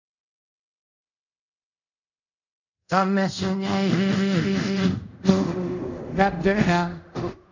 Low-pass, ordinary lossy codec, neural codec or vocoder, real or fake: 7.2 kHz; none; codec, 24 kHz, 0.5 kbps, DualCodec; fake